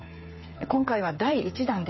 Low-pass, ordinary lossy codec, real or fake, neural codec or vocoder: 7.2 kHz; MP3, 24 kbps; fake; codec, 16 kHz, 8 kbps, FreqCodec, smaller model